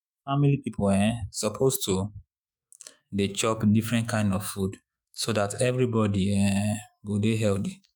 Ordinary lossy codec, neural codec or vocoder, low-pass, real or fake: none; autoencoder, 48 kHz, 128 numbers a frame, DAC-VAE, trained on Japanese speech; none; fake